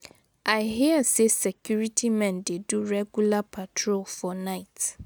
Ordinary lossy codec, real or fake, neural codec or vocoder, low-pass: none; real; none; none